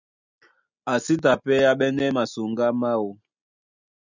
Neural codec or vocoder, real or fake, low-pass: none; real; 7.2 kHz